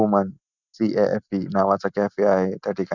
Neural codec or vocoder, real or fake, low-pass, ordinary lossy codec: none; real; 7.2 kHz; none